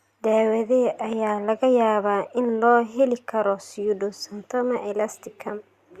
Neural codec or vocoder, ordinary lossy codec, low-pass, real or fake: none; Opus, 64 kbps; 14.4 kHz; real